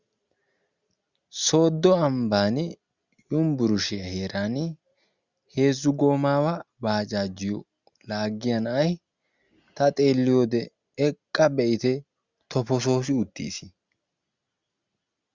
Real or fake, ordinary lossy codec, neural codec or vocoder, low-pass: real; Opus, 64 kbps; none; 7.2 kHz